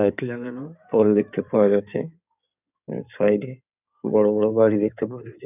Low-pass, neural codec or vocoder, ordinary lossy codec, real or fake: 3.6 kHz; codec, 16 kHz in and 24 kHz out, 2.2 kbps, FireRedTTS-2 codec; none; fake